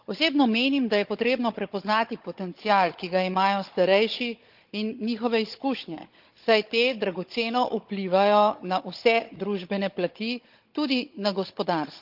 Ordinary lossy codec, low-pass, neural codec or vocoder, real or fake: Opus, 24 kbps; 5.4 kHz; codec, 16 kHz, 16 kbps, FunCodec, trained on Chinese and English, 50 frames a second; fake